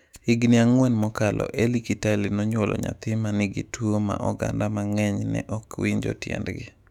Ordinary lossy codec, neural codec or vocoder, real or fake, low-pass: none; autoencoder, 48 kHz, 128 numbers a frame, DAC-VAE, trained on Japanese speech; fake; 19.8 kHz